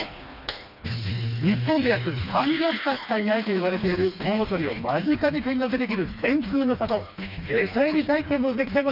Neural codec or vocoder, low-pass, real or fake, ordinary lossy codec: codec, 16 kHz, 2 kbps, FreqCodec, smaller model; 5.4 kHz; fake; none